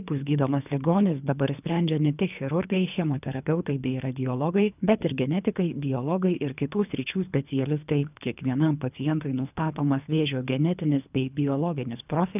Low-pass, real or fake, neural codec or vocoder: 3.6 kHz; fake; codec, 24 kHz, 3 kbps, HILCodec